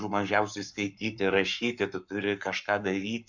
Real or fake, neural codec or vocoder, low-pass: fake; codec, 44.1 kHz, 7.8 kbps, Pupu-Codec; 7.2 kHz